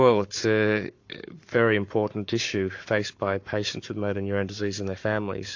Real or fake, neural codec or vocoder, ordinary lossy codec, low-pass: fake; codec, 44.1 kHz, 7.8 kbps, Pupu-Codec; AAC, 48 kbps; 7.2 kHz